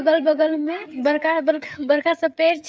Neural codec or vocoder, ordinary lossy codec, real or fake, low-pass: codec, 16 kHz, 16 kbps, FreqCodec, smaller model; none; fake; none